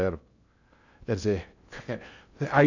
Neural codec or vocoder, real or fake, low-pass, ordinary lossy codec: codec, 16 kHz in and 24 kHz out, 0.6 kbps, FocalCodec, streaming, 2048 codes; fake; 7.2 kHz; none